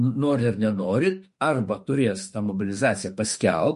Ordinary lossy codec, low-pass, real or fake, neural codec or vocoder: MP3, 48 kbps; 14.4 kHz; fake; autoencoder, 48 kHz, 32 numbers a frame, DAC-VAE, trained on Japanese speech